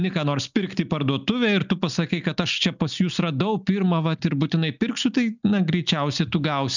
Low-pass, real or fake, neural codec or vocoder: 7.2 kHz; real; none